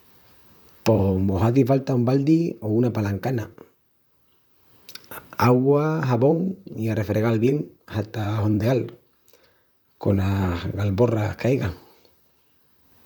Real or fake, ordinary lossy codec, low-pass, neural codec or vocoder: fake; none; none; vocoder, 44.1 kHz, 128 mel bands, Pupu-Vocoder